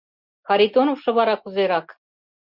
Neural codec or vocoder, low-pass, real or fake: none; 5.4 kHz; real